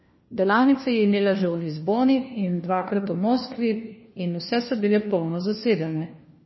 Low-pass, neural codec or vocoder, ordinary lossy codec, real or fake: 7.2 kHz; codec, 16 kHz, 1 kbps, FunCodec, trained on LibriTTS, 50 frames a second; MP3, 24 kbps; fake